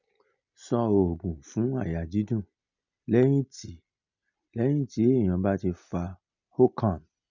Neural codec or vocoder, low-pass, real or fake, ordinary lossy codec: none; 7.2 kHz; real; none